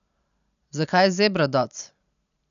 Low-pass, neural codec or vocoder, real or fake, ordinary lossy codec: 7.2 kHz; none; real; none